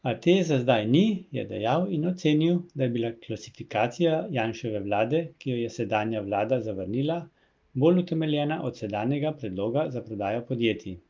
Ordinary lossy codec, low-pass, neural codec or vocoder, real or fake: Opus, 32 kbps; 7.2 kHz; none; real